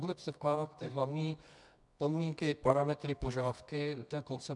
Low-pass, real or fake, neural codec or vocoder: 10.8 kHz; fake; codec, 24 kHz, 0.9 kbps, WavTokenizer, medium music audio release